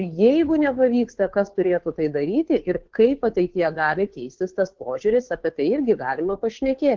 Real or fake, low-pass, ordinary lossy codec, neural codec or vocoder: fake; 7.2 kHz; Opus, 16 kbps; codec, 16 kHz, 2 kbps, FunCodec, trained on Chinese and English, 25 frames a second